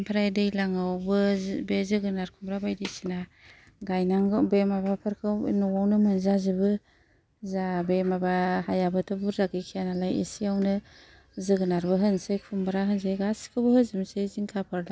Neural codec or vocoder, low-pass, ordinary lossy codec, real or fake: none; none; none; real